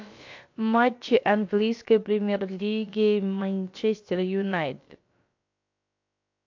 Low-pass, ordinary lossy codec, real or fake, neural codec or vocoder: 7.2 kHz; AAC, 48 kbps; fake; codec, 16 kHz, about 1 kbps, DyCAST, with the encoder's durations